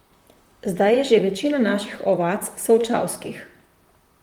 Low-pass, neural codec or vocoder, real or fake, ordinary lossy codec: 19.8 kHz; vocoder, 44.1 kHz, 128 mel bands, Pupu-Vocoder; fake; Opus, 32 kbps